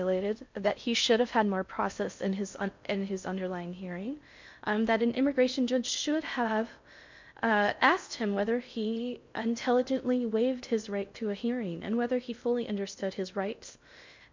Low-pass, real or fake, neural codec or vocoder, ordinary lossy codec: 7.2 kHz; fake; codec, 16 kHz in and 24 kHz out, 0.6 kbps, FocalCodec, streaming, 4096 codes; MP3, 64 kbps